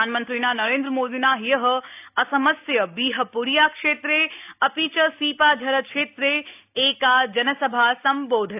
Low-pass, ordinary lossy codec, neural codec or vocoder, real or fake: 3.6 kHz; AAC, 32 kbps; none; real